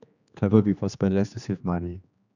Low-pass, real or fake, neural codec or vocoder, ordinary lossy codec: 7.2 kHz; fake; codec, 16 kHz, 2 kbps, X-Codec, HuBERT features, trained on general audio; none